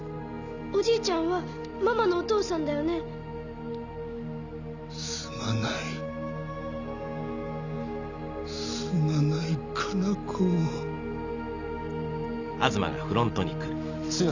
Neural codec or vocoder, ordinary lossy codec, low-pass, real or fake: none; none; 7.2 kHz; real